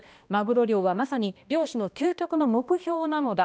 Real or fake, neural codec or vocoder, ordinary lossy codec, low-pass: fake; codec, 16 kHz, 1 kbps, X-Codec, HuBERT features, trained on balanced general audio; none; none